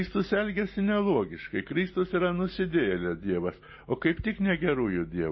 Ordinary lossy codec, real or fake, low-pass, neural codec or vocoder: MP3, 24 kbps; real; 7.2 kHz; none